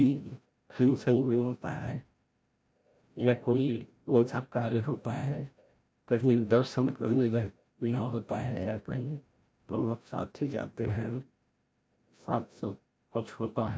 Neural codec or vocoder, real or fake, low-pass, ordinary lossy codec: codec, 16 kHz, 0.5 kbps, FreqCodec, larger model; fake; none; none